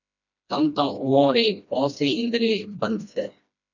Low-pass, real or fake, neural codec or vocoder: 7.2 kHz; fake; codec, 16 kHz, 1 kbps, FreqCodec, smaller model